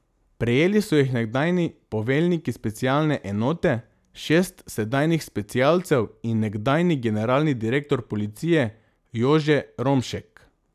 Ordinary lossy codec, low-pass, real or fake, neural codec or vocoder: none; 14.4 kHz; real; none